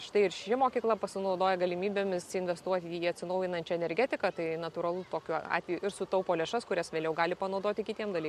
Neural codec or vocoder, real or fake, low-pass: none; real; 14.4 kHz